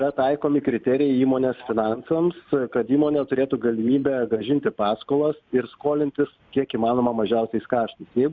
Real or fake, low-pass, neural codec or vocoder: real; 7.2 kHz; none